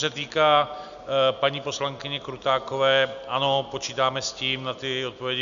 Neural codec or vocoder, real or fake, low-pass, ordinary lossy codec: none; real; 7.2 kHz; MP3, 96 kbps